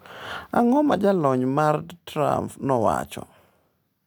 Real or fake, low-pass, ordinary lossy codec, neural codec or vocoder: real; none; none; none